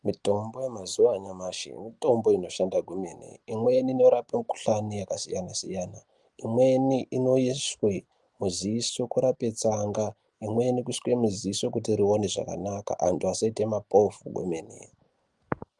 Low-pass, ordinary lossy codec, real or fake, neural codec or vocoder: 10.8 kHz; Opus, 32 kbps; real; none